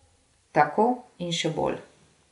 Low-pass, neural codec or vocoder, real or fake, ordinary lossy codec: 10.8 kHz; none; real; none